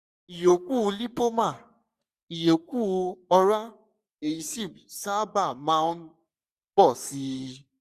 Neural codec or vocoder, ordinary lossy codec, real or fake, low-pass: codec, 44.1 kHz, 3.4 kbps, Pupu-Codec; Opus, 64 kbps; fake; 14.4 kHz